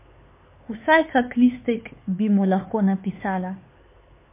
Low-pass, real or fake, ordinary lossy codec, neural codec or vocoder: 3.6 kHz; fake; MP3, 24 kbps; codec, 16 kHz, 4 kbps, X-Codec, HuBERT features, trained on LibriSpeech